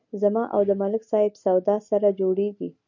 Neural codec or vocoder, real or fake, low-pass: none; real; 7.2 kHz